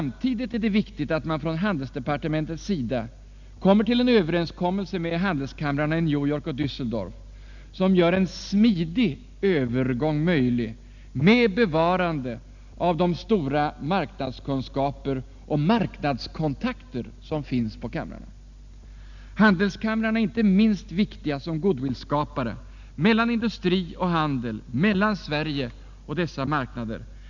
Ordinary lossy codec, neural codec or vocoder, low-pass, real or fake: none; none; 7.2 kHz; real